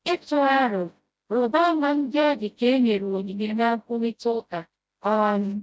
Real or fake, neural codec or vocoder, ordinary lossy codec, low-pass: fake; codec, 16 kHz, 0.5 kbps, FreqCodec, smaller model; none; none